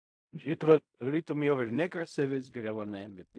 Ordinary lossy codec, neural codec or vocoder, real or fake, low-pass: AAC, 64 kbps; codec, 16 kHz in and 24 kHz out, 0.4 kbps, LongCat-Audio-Codec, fine tuned four codebook decoder; fake; 9.9 kHz